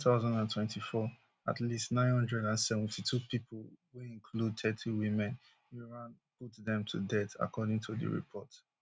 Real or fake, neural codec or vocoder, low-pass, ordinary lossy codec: real; none; none; none